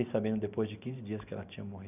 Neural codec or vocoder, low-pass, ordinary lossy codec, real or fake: none; 3.6 kHz; none; real